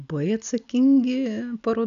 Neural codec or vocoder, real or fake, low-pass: none; real; 7.2 kHz